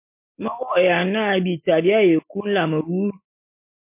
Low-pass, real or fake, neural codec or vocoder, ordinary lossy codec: 3.6 kHz; real; none; MP3, 24 kbps